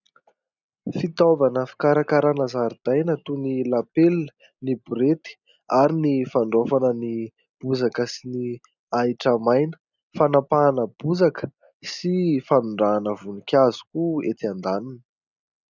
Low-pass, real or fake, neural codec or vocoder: 7.2 kHz; real; none